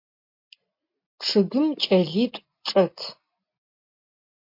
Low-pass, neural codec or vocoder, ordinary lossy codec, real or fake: 5.4 kHz; none; MP3, 32 kbps; real